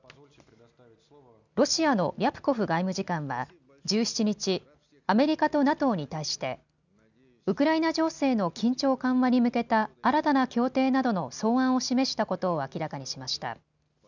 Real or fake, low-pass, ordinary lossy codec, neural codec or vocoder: real; 7.2 kHz; none; none